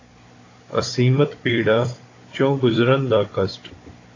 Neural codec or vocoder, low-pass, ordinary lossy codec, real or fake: codec, 16 kHz, 16 kbps, FunCodec, trained on Chinese and English, 50 frames a second; 7.2 kHz; AAC, 32 kbps; fake